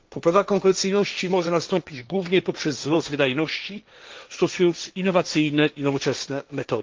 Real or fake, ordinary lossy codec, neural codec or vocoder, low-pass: fake; Opus, 32 kbps; codec, 16 kHz, 1.1 kbps, Voila-Tokenizer; 7.2 kHz